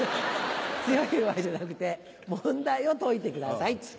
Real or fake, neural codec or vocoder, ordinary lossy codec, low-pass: real; none; none; none